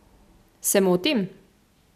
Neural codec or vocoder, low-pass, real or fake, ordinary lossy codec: none; 14.4 kHz; real; none